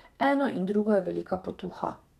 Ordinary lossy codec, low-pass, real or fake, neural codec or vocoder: none; 14.4 kHz; fake; codec, 32 kHz, 1.9 kbps, SNAC